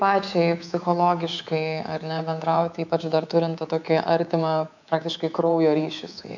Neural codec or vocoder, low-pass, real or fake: codec, 24 kHz, 3.1 kbps, DualCodec; 7.2 kHz; fake